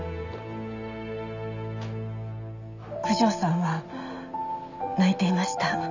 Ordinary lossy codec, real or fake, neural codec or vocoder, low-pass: none; real; none; 7.2 kHz